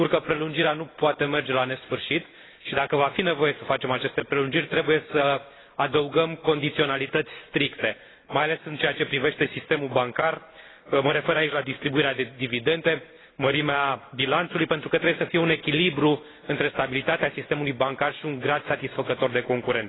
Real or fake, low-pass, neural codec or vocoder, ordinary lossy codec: real; 7.2 kHz; none; AAC, 16 kbps